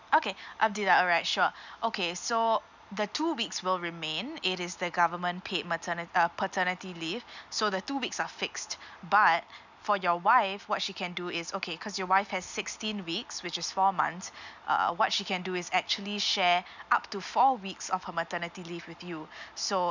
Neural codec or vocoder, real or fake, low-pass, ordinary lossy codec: none; real; 7.2 kHz; none